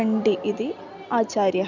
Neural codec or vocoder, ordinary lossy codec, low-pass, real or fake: none; none; 7.2 kHz; real